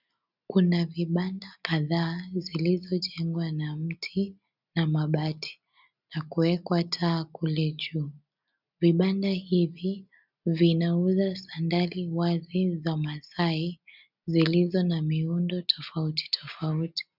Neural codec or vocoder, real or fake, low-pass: none; real; 5.4 kHz